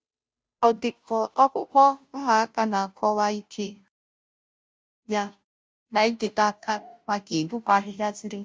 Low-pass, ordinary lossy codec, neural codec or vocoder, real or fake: none; none; codec, 16 kHz, 0.5 kbps, FunCodec, trained on Chinese and English, 25 frames a second; fake